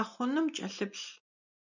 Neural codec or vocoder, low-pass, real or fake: none; 7.2 kHz; real